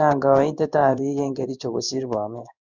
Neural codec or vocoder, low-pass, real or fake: codec, 16 kHz in and 24 kHz out, 1 kbps, XY-Tokenizer; 7.2 kHz; fake